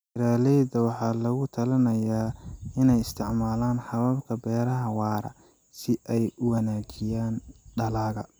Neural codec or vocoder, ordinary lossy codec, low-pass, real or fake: none; none; none; real